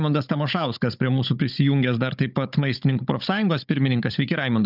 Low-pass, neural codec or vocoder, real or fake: 5.4 kHz; codec, 16 kHz, 16 kbps, FunCodec, trained on Chinese and English, 50 frames a second; fake